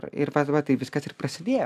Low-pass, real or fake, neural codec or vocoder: 14.4 kHz; real; none